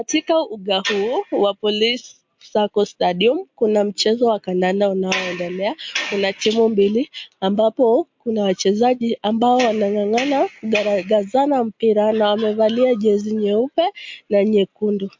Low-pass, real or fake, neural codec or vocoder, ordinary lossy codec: 7.2 kHz; real; none; MP3, 48 kbps